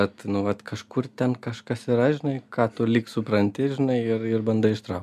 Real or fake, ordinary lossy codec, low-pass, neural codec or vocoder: real; MP3, 96 kbps; 14.4 kHz; none